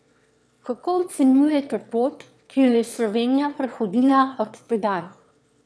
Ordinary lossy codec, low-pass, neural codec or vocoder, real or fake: none; none; autoencoder, 22.05 kHz, a latent of 192 numbers a frame, VITS, trained on one speaker; fake